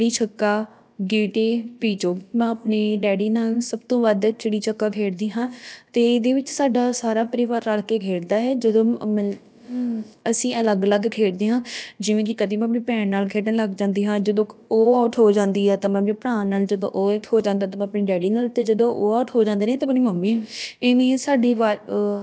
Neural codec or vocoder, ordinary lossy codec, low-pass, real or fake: codec, 16 kHz, about 1 kbps, DyCAST, with the encoder's durations; none; none; fake